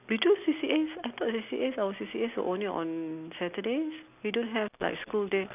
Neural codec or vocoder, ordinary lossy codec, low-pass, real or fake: none; none; 3.6 kHz; real